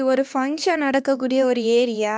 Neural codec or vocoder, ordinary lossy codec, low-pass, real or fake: codec, 16 kHz, 2 kbps, X-Codec, HuBERT features, trained on LibriSpeech; none; none; fake